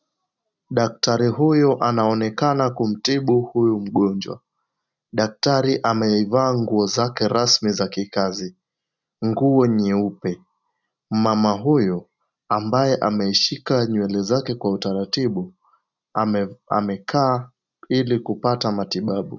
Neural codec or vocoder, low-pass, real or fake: none; 7.2 kHz; real